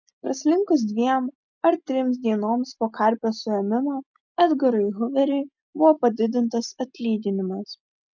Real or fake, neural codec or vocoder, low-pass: real; none; 7.2 kHz